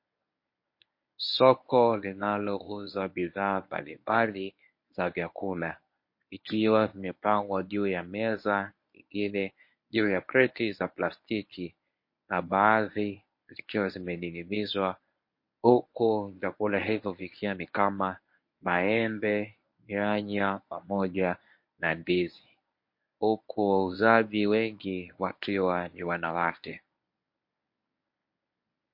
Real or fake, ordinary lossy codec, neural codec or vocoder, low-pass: fake; MP3, 32 kbps; codec, 24 kHz, 0.9 kbps, WavTokenizer, medium speech release version 1; 5.4 kHz